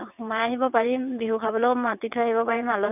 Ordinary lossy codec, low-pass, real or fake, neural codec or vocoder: none; 3.6 kHz; fake; vocoder, 22.05 kHz, 80 mel bands, WaveNeXt